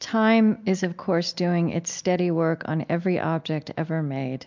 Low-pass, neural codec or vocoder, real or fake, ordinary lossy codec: 7.2 kHz; none; real; MP3, 64 kbps